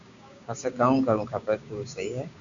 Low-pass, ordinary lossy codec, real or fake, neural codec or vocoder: 7.2 kHz; MP3, 96 kbps; fake; codec, 16 kHz, 6 kbps, DAC